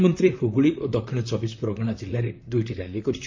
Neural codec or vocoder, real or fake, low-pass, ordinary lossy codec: vocoder, 44.1 kHz, 128 mel bands, Pupu-Vocoder; fake; 7.2 kHz; MP3, 64 kbps